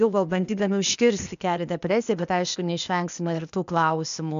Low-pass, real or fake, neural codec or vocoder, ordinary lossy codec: 7.2 kHz; fake; codec, 16 kHz, 0.8 kbps, ZipCodec; AAC, 96 kbps